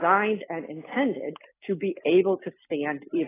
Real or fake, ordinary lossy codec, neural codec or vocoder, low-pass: real; AAC, 16 kbps; none; 3.6 kHz